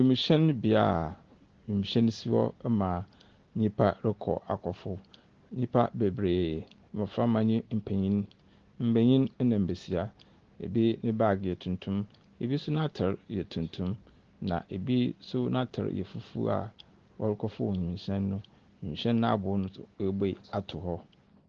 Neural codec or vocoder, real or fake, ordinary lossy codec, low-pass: none; real; Opus, 16 kbps; 7.2 kHz